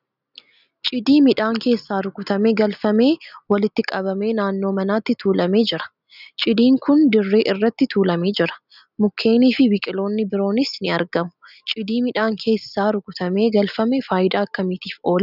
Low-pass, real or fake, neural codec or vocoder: 5.4 kHz; real; none